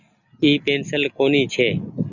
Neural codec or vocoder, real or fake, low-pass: none; real; 7.2 kHz